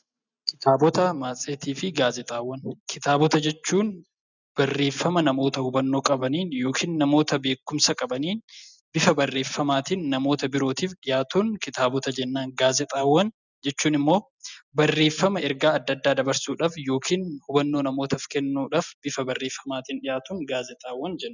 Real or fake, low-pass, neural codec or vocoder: real; 7.2 kHz; none